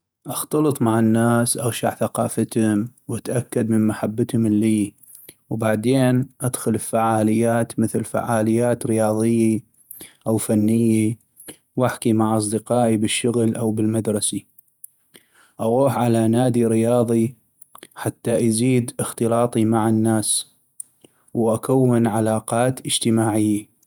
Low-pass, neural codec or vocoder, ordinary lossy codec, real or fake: none; vocoder, 48 kHz, 128 mel bands, Vocos; none; fake